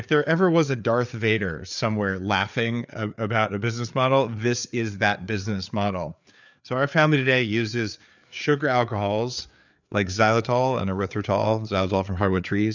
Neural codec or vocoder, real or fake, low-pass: codec, 16 kHz, 4 kbps, FreqCodec, larger model; fake; 7.2 kHz